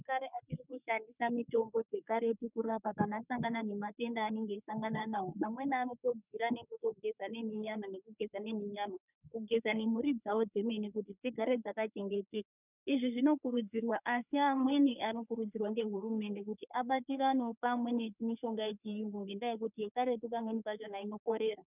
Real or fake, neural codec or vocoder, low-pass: fake; codec, 44.1 kHz, 3.4 kbps, Pupu-Codec; 3.6 kHz